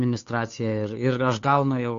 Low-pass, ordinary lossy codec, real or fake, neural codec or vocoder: 7.2 kHz; AAC, 48 kbps; fake; codec, 16 kHz, 2 kbps, FunCodec, trained on Chinese and English, 25 frames a second